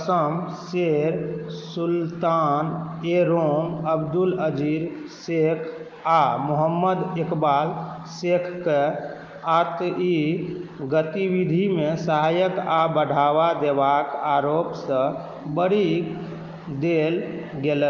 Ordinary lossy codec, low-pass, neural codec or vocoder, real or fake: Opus, 32 kbps; 7.2 kHz; none; real